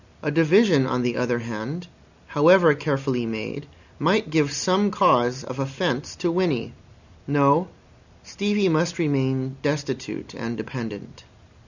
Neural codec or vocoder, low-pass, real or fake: none; 7.2 kHz; real